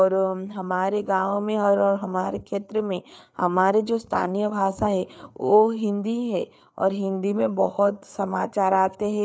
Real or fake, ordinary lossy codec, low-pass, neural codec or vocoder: fake; none; none; codec, 16 kHz, 4 kbps, FreqCodec, larger model